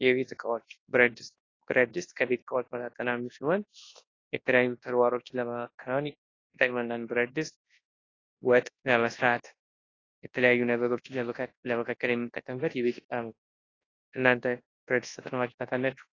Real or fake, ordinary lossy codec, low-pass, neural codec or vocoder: fake; AAC, 32 kbps; 7.2 kHz; codec, 24 kHz, 0.9 kbps, WavTokenizer, large speech release